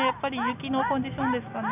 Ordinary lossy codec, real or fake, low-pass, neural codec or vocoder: none; real; 3.6 kHz; none